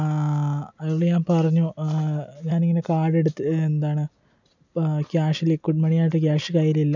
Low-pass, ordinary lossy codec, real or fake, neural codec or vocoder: 7.2 kHz; none; real; none